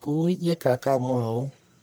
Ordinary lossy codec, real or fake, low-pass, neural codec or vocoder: none; fake; none; codec, 44.1 kHz, 1.7 kbps, Pupu-Codec